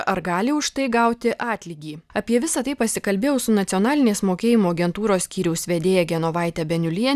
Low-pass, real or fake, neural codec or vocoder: 14.4 kHz; real; none